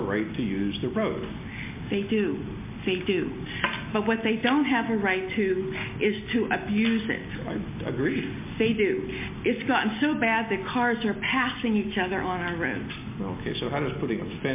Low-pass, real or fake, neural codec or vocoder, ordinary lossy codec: 3.6 kHz; real; none; MP3, 24 kbps